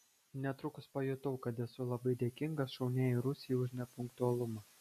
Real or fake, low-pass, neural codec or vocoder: real; 14.4 kHz; none